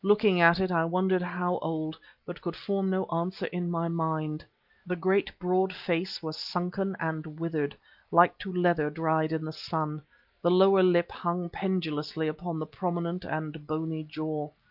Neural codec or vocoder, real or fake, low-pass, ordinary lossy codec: none; real; 5.4 kHz; Opus, 24 kbps